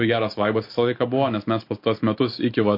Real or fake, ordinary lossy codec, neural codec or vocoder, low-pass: real; MP3, 32 kbps; none; 5.4 kHz